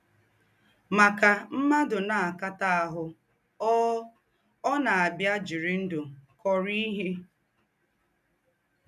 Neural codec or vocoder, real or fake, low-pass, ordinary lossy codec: none; real; 14.4 kHz; none